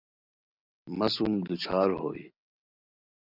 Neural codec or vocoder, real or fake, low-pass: none; real; 5.4 kHz